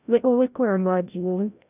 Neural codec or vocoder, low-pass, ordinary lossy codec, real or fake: codec, 16 kHz, 0.5 kbps, FreqCodec, larger model; 3.6 kHz; none; fake